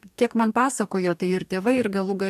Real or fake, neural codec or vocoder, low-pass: fake; codec, 44.1 kHz, 2.6 kbps, DAC; 14.4 kHz